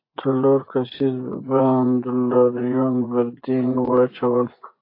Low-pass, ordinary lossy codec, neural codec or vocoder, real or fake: 5.4 kHz; AAC, 32 kbps; vocoder, 24 kHz, 100 mel bands, Vocos; fake